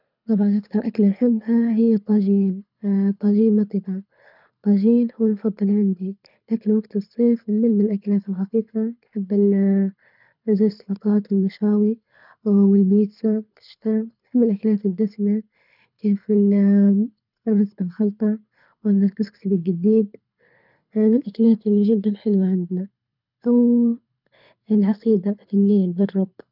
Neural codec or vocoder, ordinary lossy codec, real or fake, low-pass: codec, 24 kHz, 6 kbps, HILCodec; none; fake; 5.4 kHz